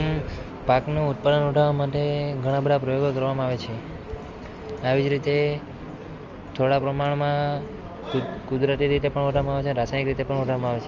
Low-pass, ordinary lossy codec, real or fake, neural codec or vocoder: 7.2 kHz; Opus, 32 kbps; real; none